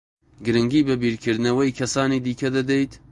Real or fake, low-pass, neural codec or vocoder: real; 10.8 kHz; none